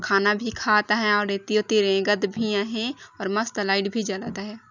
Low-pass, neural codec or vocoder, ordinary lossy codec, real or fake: 7.2 kHz; none; none; real